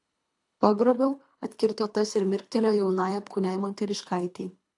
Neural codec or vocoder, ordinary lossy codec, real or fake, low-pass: codec, 24 kHz, 3 kbps, HILCodec; AAC, 64 kbps; fake; 10.8 kHz